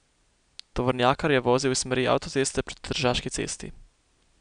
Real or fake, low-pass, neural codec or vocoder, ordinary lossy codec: real; 9.9 kHz; none; none